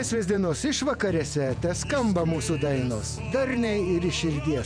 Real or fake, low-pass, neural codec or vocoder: fake; 9.9 kHz; vocoder, 48 kHz, 128 mel bands, Vocos